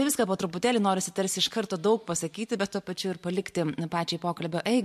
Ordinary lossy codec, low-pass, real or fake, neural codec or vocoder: MP3, 64 kbps; 14.4 kHz; fake; vocoder, 44.1 kHz, 128 mel bands every 512 samples, BigVGAN v2